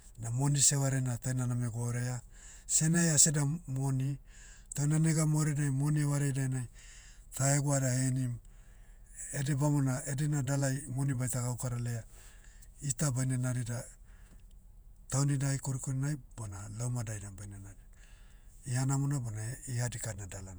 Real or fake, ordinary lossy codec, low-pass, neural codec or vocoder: fake; none; none; vocoder, 48 kHz, 128 mel bands, Vocos